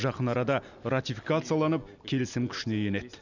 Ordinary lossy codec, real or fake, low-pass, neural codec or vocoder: none; real; 7.2 kHz; none